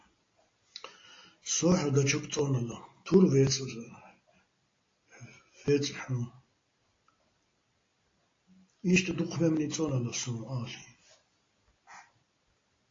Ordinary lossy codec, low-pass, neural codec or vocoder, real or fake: AAC, 32 kbps; 7.2 kHz; none; real